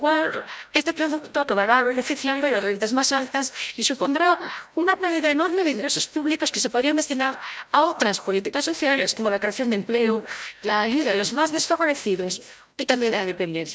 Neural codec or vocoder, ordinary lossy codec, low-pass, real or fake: codec, 16 kHz, 0.5 kbps, FreqCodec, larger model; none; none; fake